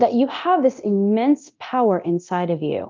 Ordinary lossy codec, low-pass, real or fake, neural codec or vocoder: Opus, 24 kbps; 7.2 kHz; fake; codec, 24 kHz, 0.9 kbps, DualCodec